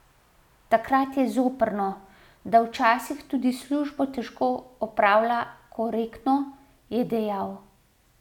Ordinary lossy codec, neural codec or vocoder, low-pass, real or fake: none; none; 19.8 kHz; real